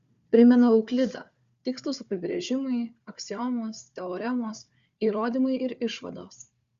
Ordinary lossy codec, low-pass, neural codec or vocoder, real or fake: Opus, 64 kbps; 7.2 kHz; codec, 16 kHz, 4 kbps, FunCodec, trained on Chinese and English, 50 frames a second; fake